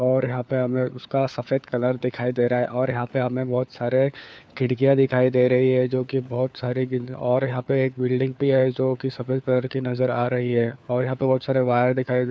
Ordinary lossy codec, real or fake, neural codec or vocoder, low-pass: none; fake; codec, 16 kHz, 4 kbps, FunCodec, trained on LibriTTS, 50 frames a second; none